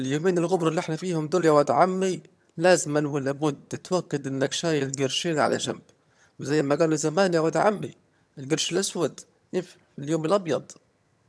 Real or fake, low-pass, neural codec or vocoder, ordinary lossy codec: fake; none; vocoder, 22.05 kHz, 80 mel bands, HiFi-GAN; none